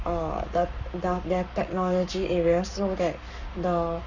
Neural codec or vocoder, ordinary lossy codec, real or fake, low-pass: codec, 44.1 kHz, 7.8 kbps, Pupu-Codec; none; fake; 7.2 kHz